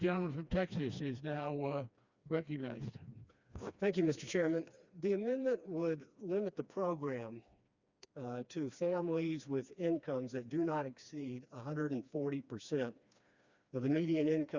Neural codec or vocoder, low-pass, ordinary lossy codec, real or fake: codec, 16 kHz, 2 kbps, FreqCodec, smaller model; 7.2 kHz; Opus, 64 kbps; fake